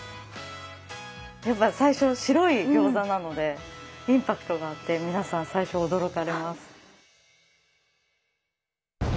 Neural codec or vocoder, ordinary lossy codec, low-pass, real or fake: none; none; none; real